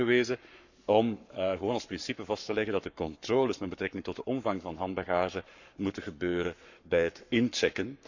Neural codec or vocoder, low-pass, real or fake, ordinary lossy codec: codec, 44.1 kHz, 7.8 kbps, Pupu-Codec; 7.2 kHz; fake; none